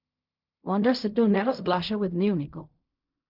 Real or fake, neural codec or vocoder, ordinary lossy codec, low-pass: fake; codec, 16 kHz in and 24 kHz out, 0.4 kbps, LongCat-Audio-Codec, fine tuned four codebook decoder; none; 5.4 kHz